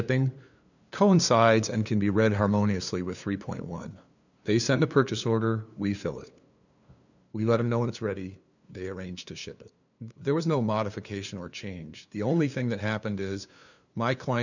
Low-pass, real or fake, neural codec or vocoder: 7.2 kHz; fake; codec, 16 kHz, 2 kbps, FunCodec, trained on LibriTTS, 25 frames a second